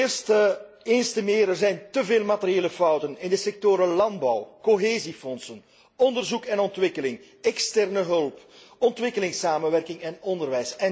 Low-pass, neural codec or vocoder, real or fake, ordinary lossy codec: none; none; real; none